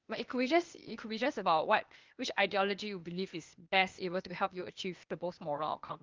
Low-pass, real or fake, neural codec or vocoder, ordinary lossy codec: 7.2 kHz; fake; codec, 16 kHz, 0.8 kbps, ZipCodec; Opus, 24 kbps